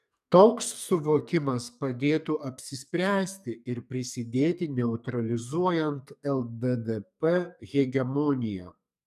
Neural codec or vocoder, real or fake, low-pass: codec, 44.1 kHz, 2.6 kbps, SNAC; fake; 14.4 kHz